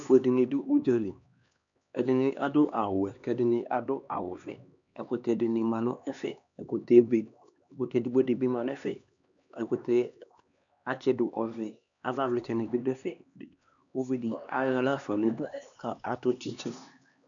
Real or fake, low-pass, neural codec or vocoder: fake; 7.2 kHz; codec, 16 kHz, 2 kbps, X-Codec, HuBERT features, trained on LibriSpeech